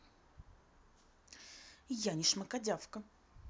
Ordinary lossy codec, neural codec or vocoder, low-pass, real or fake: none; none; none; real